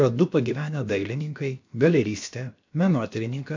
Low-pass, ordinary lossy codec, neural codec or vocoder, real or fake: 7.2 kHz; MP3, 64 kbps; codec, 16 kHz, about 1 kbps, DyCAST, with the encoder's durations; fake